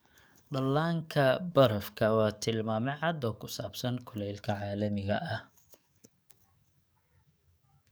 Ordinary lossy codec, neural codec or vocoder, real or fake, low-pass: none; codec, 44.1 kHz, 7.8 kbps, DAC; fake; none